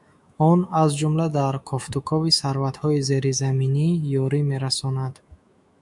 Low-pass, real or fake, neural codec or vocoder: 10.8 kHz; fake; autoencoder, 48 kHz, 128 numbers a frame, DAC-VAE, trained on Japanese speech